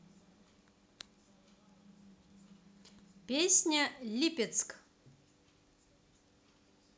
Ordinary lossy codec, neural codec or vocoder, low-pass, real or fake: none; none; none; real